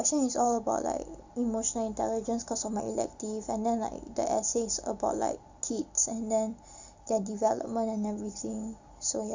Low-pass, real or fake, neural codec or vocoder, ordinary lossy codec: 7.2 kHz; real; none; Opus, 64 kbps